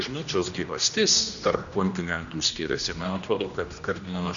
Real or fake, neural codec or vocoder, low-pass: fake; codec, 16 kHz, 1 kbps, X-Codec, HuBERT features, trained on general audio; 7.2 kHz